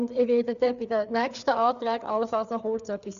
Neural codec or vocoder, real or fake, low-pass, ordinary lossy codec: codec, 16 kHz, 4 kbps, FreqCodec, larger model; fake; 7.2 kHz; none